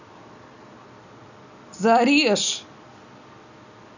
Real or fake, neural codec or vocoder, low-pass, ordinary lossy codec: real; none; 7.2 kHz; none